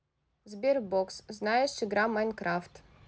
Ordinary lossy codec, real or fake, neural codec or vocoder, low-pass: none; real; none; none